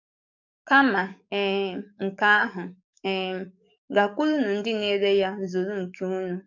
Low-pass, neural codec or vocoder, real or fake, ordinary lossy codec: 7.2 kHz; codec, 44.1 kHz, 7.8 kbps, DAC; fake; none